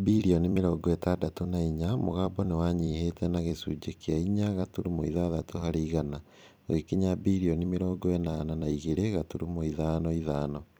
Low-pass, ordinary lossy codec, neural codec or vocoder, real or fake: none; none; vocoder, 44.1 kHz, 128 mel bands every 256 samples, BigVGAN v2; fake